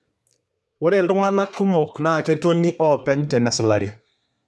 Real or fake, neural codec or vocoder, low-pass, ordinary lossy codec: fake; codec, 24 kHz, 1 kbps, SNAC; none; none